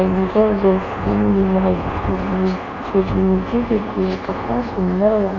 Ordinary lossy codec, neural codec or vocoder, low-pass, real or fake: none; codec, 24 kHz, 1.2 kbps, DualCodec; 7.2 kHz; fake